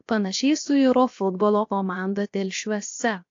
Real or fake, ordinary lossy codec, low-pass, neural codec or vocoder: fake; AAC, 48 kbps; 7.2 kHz; codec, 16 kHz, 0.7 kbps, FocalCodec